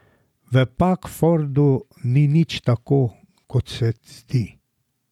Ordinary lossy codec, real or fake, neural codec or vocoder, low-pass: none; fake; vocoder, 44.1 kHz, 128 mel bands every 512 samples, BigVGAN v2; 19.8 kHz